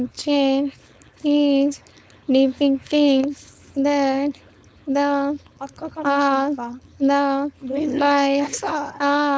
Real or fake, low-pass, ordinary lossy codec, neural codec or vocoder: fake; none; none; codec, 16 kHz, 4.8 kbps, FACodec